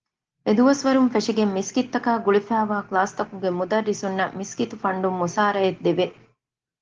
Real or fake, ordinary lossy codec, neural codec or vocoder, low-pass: real; Opus, 16 kbps; none; 7.2 kHz